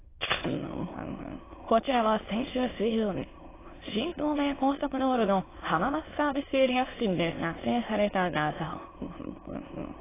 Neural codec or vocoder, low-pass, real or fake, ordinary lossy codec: autoencoder, 22.05 kHz, a latent of 192 numbers a frame, VITS, trained on many speakers; 3.6 kHz; fake; AAC, 16 kbps